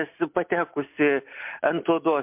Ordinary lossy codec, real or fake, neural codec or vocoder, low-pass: MP3, 32 kbps; real; none; 3.6 kHz